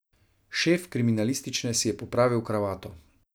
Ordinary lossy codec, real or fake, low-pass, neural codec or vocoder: none; real; none; none